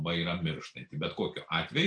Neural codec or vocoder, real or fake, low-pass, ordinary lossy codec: none; real; 9.9 kHz; AAC, 48 kbps